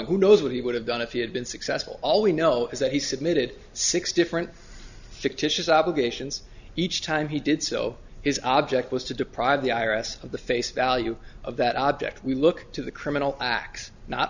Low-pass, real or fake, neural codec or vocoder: 7.2 kHz; real; none